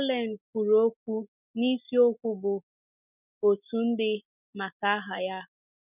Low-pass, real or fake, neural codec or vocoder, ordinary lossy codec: 3.6 kHz; real; none; none